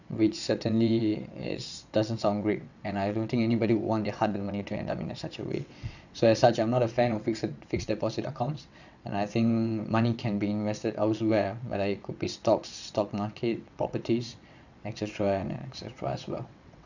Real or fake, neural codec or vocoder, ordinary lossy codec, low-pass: fake; vocoder, 22.05 kHz, 80 mel bands, WaveNeXt; none; 7.2 kHz